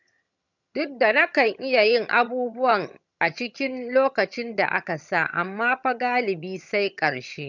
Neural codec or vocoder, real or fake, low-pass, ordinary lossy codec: vocoder, 22.05 kHz, 80 mel bands, HiFi-GAN; fake; 7.2 kHz; none